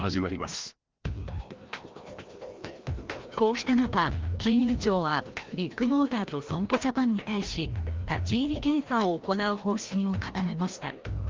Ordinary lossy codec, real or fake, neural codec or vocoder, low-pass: Opus, 32 kbps; fake; codec, 16 kHz, 1 kbps, FreqCodec, larger model; 7.2 kHz